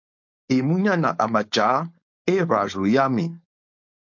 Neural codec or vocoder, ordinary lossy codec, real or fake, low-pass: codec, 16 kHz, 4.8 kbps, FACodec; MP3, 48 kbps; fake; 7.2 kHz